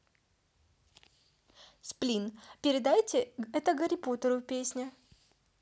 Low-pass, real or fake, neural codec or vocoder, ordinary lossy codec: none; real; none; none